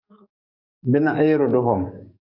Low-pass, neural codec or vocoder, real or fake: 5.4 kHz; codec, 44.1 kHz, 7.8 kbps, DAC; fake